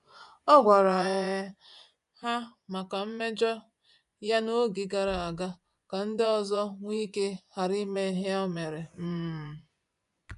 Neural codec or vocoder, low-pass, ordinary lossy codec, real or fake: vocoder, 24 kHz, 100 mel bands, Vocos; 10.8 kHz; none; fake